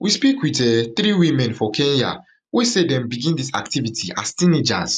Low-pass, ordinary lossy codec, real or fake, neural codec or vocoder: none; none; real; none